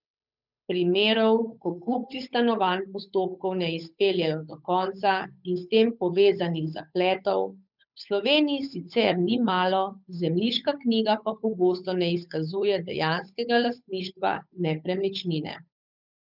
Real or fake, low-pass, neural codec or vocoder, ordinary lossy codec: fake; 5.4 kHz; codec, 16 kHz, 8 kbps, FunCodec, trained on Chinese and English, 25 frames a second; none